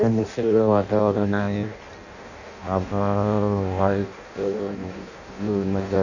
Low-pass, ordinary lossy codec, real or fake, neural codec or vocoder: 7.2 kHz; none; fake; codec, 16 kHz in and 24 kHz out, 0.6 kbps, FireRedTTS-2 codec